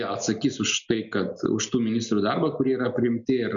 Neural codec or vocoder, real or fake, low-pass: none; real; 7.2 kHz